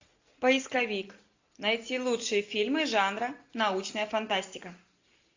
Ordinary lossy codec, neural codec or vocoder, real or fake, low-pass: AAC, 48 kbps; none; real; 7.2 kHz